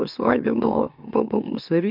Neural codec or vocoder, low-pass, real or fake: autoencoder, 44.1 kHz, a latent of 192 numbers a frame, MeloTTS; 5.4 kHz; fake